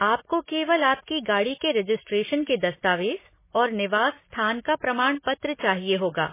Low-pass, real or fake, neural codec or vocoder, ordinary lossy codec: 3.6 kHz; real; none; MP3, 16 kbps